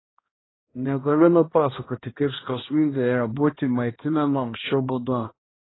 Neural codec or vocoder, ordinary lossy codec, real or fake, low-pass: codec, 16 kHz, 1 kbps, X-Codec, HuBERT features, trained on balanced general audio; AAC, 16 kbps; fake; 7.2 kHz